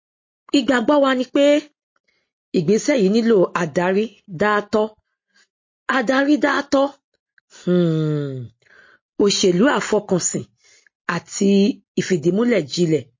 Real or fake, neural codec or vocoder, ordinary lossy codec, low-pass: real; none; MP3, 32 kbps; 7.2 kHz